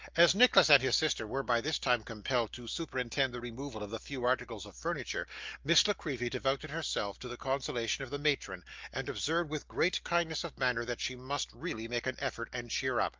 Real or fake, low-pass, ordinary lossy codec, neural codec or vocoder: real; 7.2 kHz; Opus, 24 kbps; none